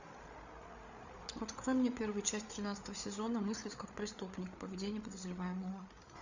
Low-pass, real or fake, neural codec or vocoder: 7.2 kHz; fake; codec, 16 kHz, 8 kbps, FreqCodec, larger model